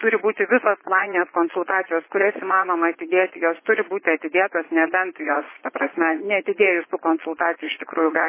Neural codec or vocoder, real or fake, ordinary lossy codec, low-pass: vocoder, 22.05 kHz, 80 mel bands, Vocos; fake; MP3, 16 kbps; 3.6 kHz